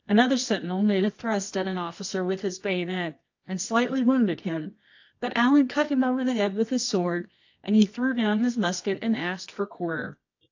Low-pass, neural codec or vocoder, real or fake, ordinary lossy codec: 7.2 kHz; codec, 24 kHz, 0.9 kbps, WavTokenizer, medium music audio release; fake; AAC, 48 kbps